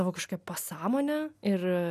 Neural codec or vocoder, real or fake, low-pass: none; real; 14.4 kHz